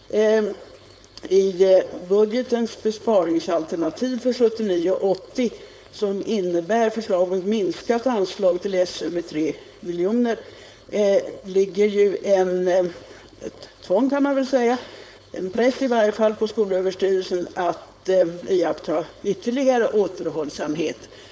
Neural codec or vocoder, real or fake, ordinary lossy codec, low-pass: codec, 16 kHz, 4.8 kbps, FACodec; fake; none; none